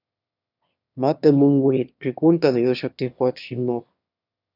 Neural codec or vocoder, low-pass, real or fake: autoencoder, 22.05 kHz, a latent of 192 numbers a frame, VITS, trained on one speaker; 5.4 kHz; fake